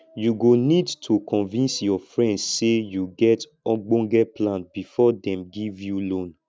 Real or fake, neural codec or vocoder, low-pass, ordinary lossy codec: real; none; none; none